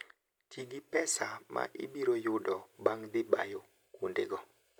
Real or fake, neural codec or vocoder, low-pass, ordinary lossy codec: real; none; none; none